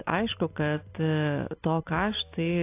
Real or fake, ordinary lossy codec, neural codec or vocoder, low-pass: real; AAC, 24 kbps; none; 3.6 kHz